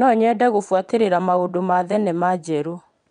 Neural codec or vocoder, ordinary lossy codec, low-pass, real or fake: vocoder, 22.05 kHz, 80 mel bands, WaveNeXt; none; 9.9 kHz; fake